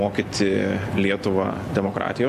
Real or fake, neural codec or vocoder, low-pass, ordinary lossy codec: real; none; 14.4 kHz; AAC, 96 kbps